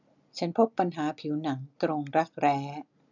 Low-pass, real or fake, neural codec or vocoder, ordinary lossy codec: 7.2 kHz; real; none; none